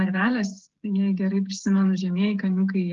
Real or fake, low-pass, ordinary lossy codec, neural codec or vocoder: fake; 7.2 kHz; Opus, 16 kbps; codec, 16 kHz, 16 kbps, FreqCodec, smaller model